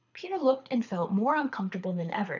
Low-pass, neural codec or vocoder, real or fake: 7.2 kHz; codec, 24 kHz, 6 kbps, HILCodec; fake